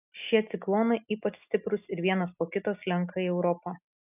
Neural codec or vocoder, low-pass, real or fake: none; 3.6 kHz; real